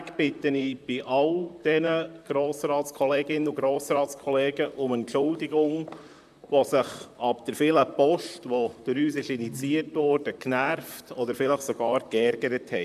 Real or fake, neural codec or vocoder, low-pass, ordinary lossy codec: fake; vocoder, 44.1 kHz, 128 mel bands, Pupu-Vocoder; 14.4 kHz; none